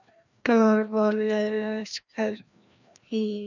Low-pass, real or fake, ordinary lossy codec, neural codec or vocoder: 7.2 kHz; fake; none; codec, 16 kHz, 1 kbps, FreqCodec, larger model